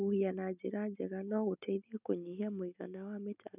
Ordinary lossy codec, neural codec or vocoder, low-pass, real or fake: none; none; 3.6 kHz; real